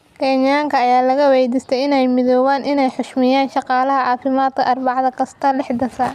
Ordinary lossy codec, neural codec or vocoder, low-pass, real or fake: none; none; 14.4 kHz; real